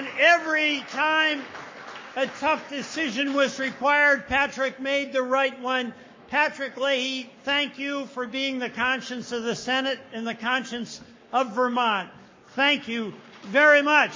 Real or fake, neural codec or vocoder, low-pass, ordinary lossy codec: fake; codec, 44.1 kHz, 7.8 kbps, Pupu-Codec; 7.2 kHz; MP3, 32 kbps